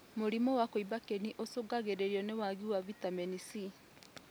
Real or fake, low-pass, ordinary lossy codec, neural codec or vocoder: real; none; none; none